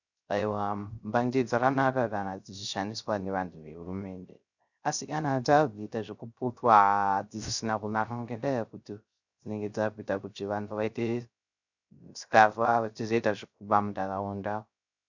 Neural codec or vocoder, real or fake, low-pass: codec, 16 kHz, 0.3 kbps, FocalCodec; fake; 7.2 kHz